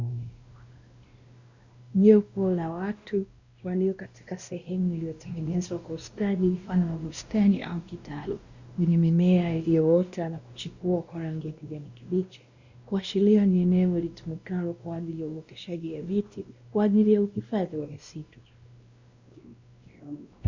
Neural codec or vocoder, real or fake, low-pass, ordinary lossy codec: codec, 16 kHz, 1 kbps, X-Codec, WavLM features, trained on Multilingual LibriSpeech; fake; 7.2 kHz; Opus, 64 kbps